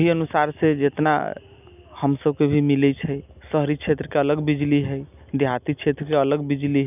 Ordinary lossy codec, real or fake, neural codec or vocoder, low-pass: none; real; none; 3.6 kHz